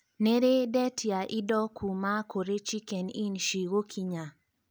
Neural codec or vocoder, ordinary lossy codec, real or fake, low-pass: none; none; real; none